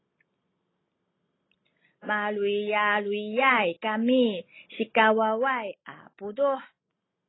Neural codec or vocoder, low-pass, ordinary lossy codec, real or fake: none; 7.2 kHz; AAC, 16 kbps; real